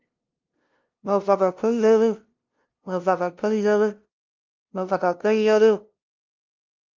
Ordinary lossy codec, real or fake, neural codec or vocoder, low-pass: Opus, 24 kbps; fake; codec, 16 kHz, 0.5 kbps, FunCodec, trained on LibriTTS, 25 frames a second; 7.2 kHz